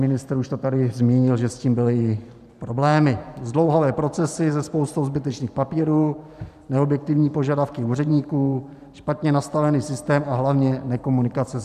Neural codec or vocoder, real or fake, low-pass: vocoder, 44.1 kHz, 128 mel bands every 512 samples, BigVGAN v2; fake; 14.4 kHz